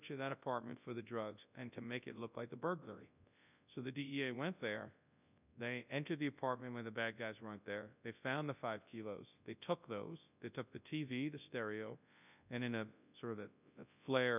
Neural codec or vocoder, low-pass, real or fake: codec, 24 kHz, 0.9 kbps, WavTokenizer, large speech release; 3.6 kHz; fake